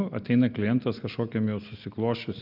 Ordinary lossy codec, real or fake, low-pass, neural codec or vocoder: Opus, 32 kbps; real; 5.4 kHz; none